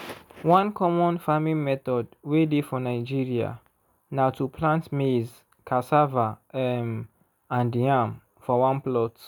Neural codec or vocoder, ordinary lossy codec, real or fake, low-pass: none; none; real; none